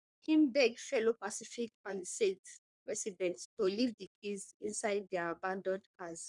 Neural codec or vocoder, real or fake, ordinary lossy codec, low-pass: codec, 44.1 kHz, 3.4 kbps, Pupu-Codec; fake; none; 10.8 kHz